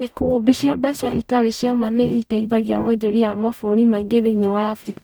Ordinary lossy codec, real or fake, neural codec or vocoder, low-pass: none; fake; codec, 44.1 kHz, 0.9 kbps, DAC; none